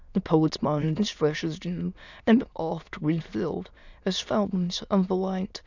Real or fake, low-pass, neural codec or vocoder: fake; 7.2 kHz; autoencoder, 22.05 kHz, a latent of 192 numbers a frame, VITS, trained on many speakers